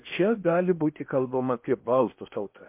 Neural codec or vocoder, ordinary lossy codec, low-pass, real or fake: codec, 16 kHz in and 24 kHz out, 0.8 kbps, FocalCodec, streaming, 65536 codes; MP3, 32 kbps; 3.6 kHz; fake